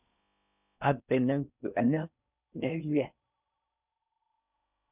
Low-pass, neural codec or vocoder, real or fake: 3.6 kHz; codec, 16 kHz in and 24 kHz out, 0.6 kbps, FocalCodec, streaming, 4096 codes; fake